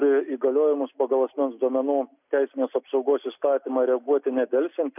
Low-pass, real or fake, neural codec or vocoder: 3.6 kHz; real; none